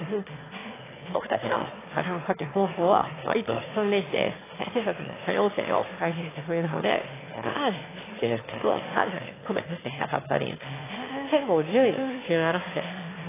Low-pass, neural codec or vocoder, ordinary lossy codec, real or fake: 3.6 kHz; autoencoder, 22.05 kHz, a latent of 192 numbers a frame, VITS, trained on one speaker; AAC, 16 kbps; fake